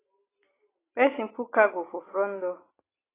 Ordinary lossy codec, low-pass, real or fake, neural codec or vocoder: AAC, 16 kbps; 3.6 kHz; real; none